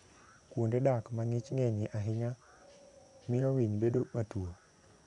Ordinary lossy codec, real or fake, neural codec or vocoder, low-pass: none; fake; vocoder, 24 kHz, 100 mel bands, Vocos; 10.8 kHz